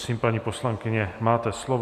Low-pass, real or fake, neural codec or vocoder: 14.4 kHz; real; none